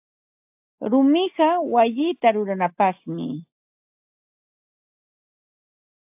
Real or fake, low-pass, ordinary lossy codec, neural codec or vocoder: real; 3.6 kHz; AAC, 32 kbps; none